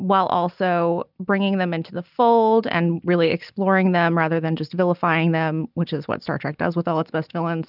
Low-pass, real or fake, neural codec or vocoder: 5.4 kHz; real; none